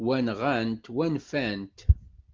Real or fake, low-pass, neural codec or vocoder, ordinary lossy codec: real; 7.2 kHz; none; Opus, 24 kbps